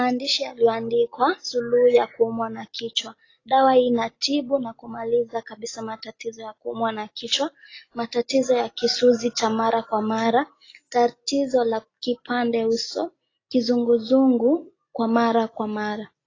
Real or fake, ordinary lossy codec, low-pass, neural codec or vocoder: real; AAC, 32 kbps; 7.2 kHz; none